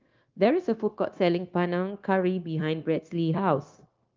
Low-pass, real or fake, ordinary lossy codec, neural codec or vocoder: 7.2 kHz; fake; Opus, 32 kbps; codec, 16 kHz, 0.9 kbps, LongCat-Audio-Codec